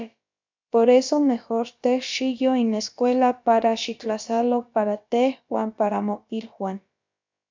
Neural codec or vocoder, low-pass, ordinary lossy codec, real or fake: codec, 16 kHz, about 1 kbps, DyCAST, with the encoder's durations; 7.2 kHz; MP3, 64 kbps; fake